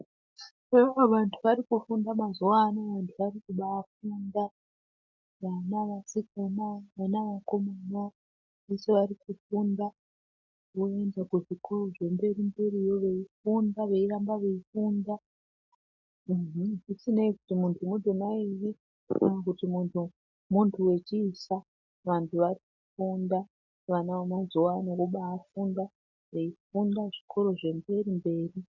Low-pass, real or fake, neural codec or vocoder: 7.2 kHz; real; none